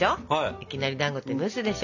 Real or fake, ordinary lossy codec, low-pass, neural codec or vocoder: real; none; 7.2 kHz; none